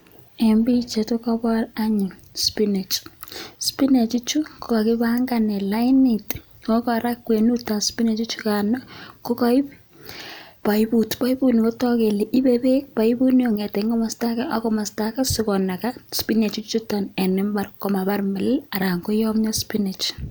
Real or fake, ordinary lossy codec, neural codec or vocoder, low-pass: real; none; none; none